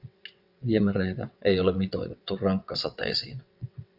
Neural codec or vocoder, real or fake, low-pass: vocoder, 22.05 kHz, 80 mel bands, WaveNeXt; fake; 5.4 kHz